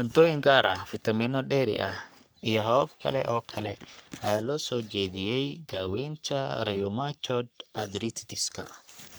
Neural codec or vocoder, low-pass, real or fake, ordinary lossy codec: codec, 44.1 kHz, 3.4 kbps, Pupu-Codec; none; fake; none